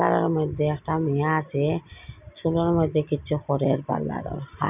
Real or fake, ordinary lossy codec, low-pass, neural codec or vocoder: real; none; 3.6 kHz; none